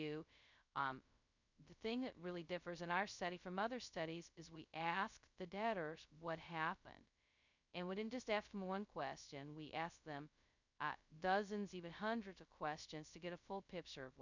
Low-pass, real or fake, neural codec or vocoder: 7.2 kHz; fake; codec, 16 kHz, 0.2 kbps, FocalCodec